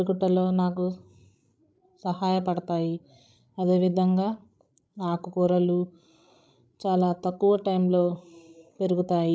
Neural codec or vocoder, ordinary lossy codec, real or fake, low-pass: codec, 16 kHz, 16 kbps, FreqCodec, larger model; none; fake; none